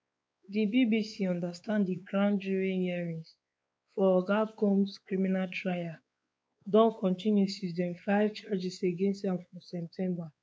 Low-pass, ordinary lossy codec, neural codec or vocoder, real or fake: none; none; codec, 16 kHz, 4 kbps, X-Codec, WavLM features, trained on Multilingual LibriSpeech; fake